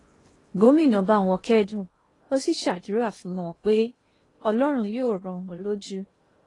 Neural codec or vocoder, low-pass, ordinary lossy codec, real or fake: codec, 16 kHz in and 24 kHz out, 0.8 kbps, FocalCodec, streaming, 65536 codes; 10.8 kHz; AAC, 32 kbps; fake